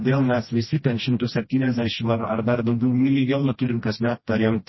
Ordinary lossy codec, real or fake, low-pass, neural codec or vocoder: MP3, 24 kbps; fake; 7.2 kHz; codec, 16 kHz, 1 kbps, FreqCodec, smaller model